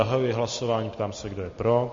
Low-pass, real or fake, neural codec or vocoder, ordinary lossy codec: 7.2 kHz; real; none; MP3, 32 kbps